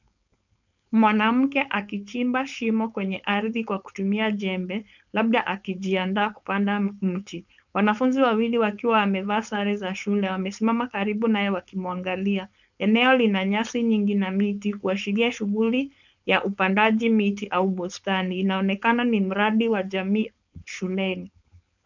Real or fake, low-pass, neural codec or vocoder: fake; 7.2 kHz; codec, 16 kHz, 4.8 kbps, FACodec